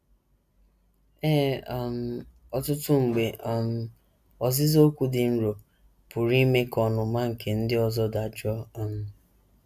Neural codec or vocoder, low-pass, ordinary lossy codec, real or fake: none; 14.4 kHz; none; real